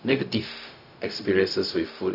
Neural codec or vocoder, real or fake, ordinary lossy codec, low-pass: codec, 16 kHz, 0.4 kbps, LongCat-Audio-Codec; fake; none; 5.4 kHz